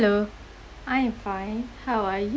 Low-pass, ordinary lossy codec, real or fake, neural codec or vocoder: none; none; real; none